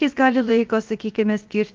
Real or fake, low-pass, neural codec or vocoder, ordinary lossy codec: fake; 7.2 kHz; codec, 16 kHz, 0.7 kbps, FocalCodec; Opus, 32 kbps